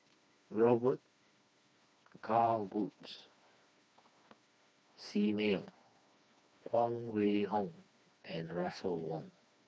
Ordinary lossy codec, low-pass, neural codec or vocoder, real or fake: none; none; codec, 16 kHz, 2 kbps, FreqCodec, smaller model; fake